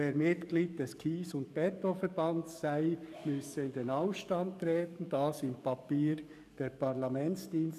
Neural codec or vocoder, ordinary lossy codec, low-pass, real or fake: codec, 44.1 kHz, 7.8 kbps, DAC; none; 14.4 kHz; fake